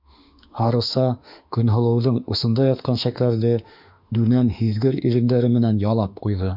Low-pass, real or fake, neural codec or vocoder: 5.4 kHz; fake; autoencoder, 48 kHz, 32 numbers a frame, DAC-VAE, trained on Japanese speech